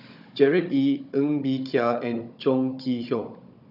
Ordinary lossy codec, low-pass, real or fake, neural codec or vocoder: none; 5.4 kHz; fake; codec, 16 kHz, 16 kbps, FunCodec, trained on Chinese and English, 50 frames a second